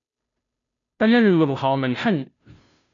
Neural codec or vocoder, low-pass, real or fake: codec, 16 kHz, 0.5 kbps, FunCodec, trained on Chinese and English, 25 frames a second; 7.2 kHz; fake